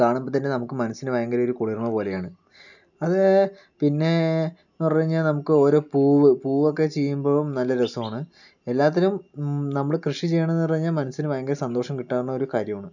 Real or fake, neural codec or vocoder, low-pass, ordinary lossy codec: real; none; 7.2 kHz; none